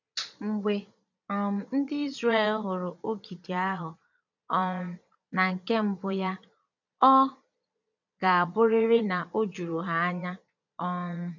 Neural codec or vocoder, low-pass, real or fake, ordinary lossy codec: vocoder, 22.05 kHz, 80 mel bands, Vocos; 7.2 kHz; fake; none